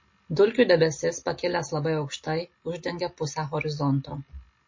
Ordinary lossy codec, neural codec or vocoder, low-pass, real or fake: MP3, 32 kbps; none; 7.2 kHz; real